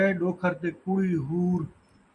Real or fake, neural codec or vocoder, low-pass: real; none; 10.8 kHz